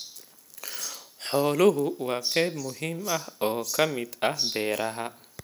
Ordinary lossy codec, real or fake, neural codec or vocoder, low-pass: none; real; none; none